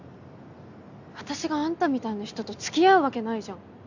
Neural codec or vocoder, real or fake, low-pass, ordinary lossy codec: none; real; 7.2 kHz; none